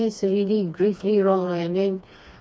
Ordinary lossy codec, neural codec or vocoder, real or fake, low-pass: none; codec, 16 kHz, 2 kbps, FreqCodec, smaller model; fake; none